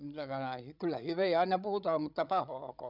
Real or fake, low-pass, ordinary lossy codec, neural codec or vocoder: fake; 5.4 kHz; none; codec, 16 kHz, 16 kbps, FreqCodec, larger model